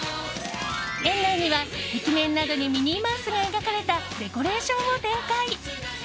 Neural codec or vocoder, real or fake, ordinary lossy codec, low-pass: none; real; none; none